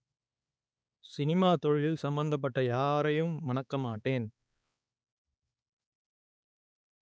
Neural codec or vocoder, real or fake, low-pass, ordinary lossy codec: codec, 16 kHz, 4 kbps, X-Codec, HuBERT features, trained on balanced general audio; fake; none; none